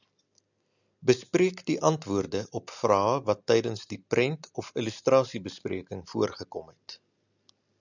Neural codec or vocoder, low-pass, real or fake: none; 7.2 kHz; real